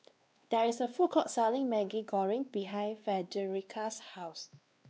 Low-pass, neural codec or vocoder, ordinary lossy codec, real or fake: none; codec, 16 kHz, 2 kbps, X-Codec, WavLM features, trained on Multilingual LibriSpeech; none; fake